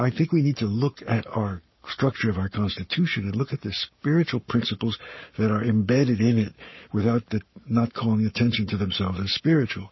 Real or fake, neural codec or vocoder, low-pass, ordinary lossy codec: fake; codec, 44.1 kHz, 7.8 kbps, Pupu-Codec; 7.2 kHz; MP3, 24 kbps